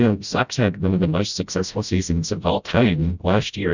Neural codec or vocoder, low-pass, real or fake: codec, 16 kHz, 0.5 kbps, FreqCodec, smaller model; 7.2 kHz; fake